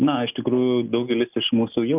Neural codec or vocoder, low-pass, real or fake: none; 3.6 kHz; real